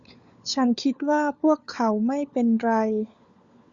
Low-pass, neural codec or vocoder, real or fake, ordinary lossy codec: 7.2 kHz; codec, 16 kHz, 4 kbps, FunCodec, trained on LibriTTS, 50 frames a second; fake; Opus, 64 kbps